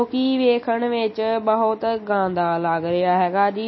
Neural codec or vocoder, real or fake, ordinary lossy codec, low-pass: none; real; MP3, 24 kbps; 7.2 kHz